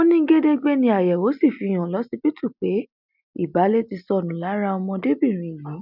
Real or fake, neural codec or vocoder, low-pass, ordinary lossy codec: real; none; 5.4 kHz; none